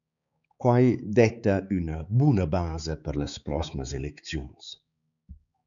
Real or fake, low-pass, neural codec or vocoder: fake; 7.2 kHz; codec, 16 kHz, 4 kbps, X-Codec, HuBERT features, trained on balanced general audio